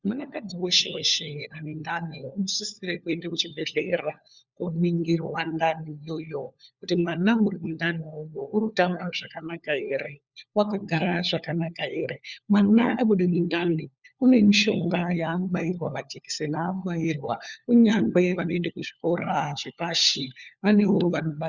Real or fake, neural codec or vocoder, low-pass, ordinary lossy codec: fake; codec, 16 kHz, 4 kbps, FunCodec, trained on LibriTTS, 50 frames a second; 7.2 kHz; Opus, 64 kbps